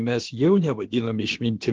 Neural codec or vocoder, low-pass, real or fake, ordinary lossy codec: codec, 16 kHz, about 1 kbps, DyCAST, with the encoder's durations; 7.2 kHz; fake; Opus, 16 kbps